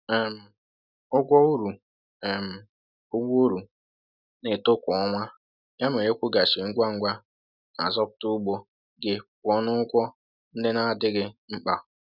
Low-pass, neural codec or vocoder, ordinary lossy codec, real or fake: 5.4 kHz; none; none; real